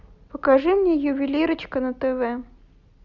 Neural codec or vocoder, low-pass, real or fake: none; 7.2 kHz; real